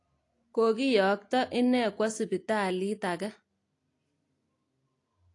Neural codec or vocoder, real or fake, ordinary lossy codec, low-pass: none; real; AAC, 48 kbps; 10.8 kHz